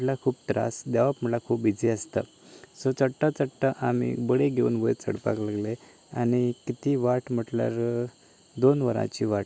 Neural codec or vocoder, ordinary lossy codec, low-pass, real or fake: none; none; none; real